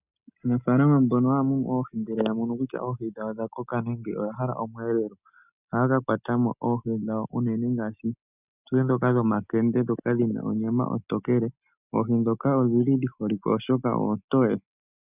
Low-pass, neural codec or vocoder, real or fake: 3.6 kHz; none; real